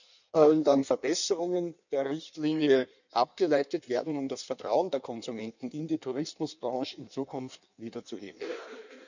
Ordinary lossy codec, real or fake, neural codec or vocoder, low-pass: none; fake; codec, 16 kHz in and 24 kHz out, 1.1 kbps, FireRedTTS-2 codec; 7.2 kHz